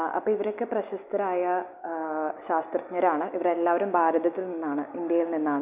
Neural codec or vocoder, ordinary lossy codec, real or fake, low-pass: none; none; real; 3.6 kHz